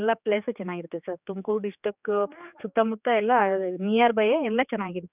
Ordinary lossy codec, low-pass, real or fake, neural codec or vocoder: none; 3.6 kHz; fake; codec, 16 kHz, 4 kbps, X-Codec, HuBERT features, trained on general audio